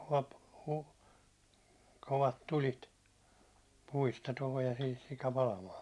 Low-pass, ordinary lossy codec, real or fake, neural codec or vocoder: none; none; real; none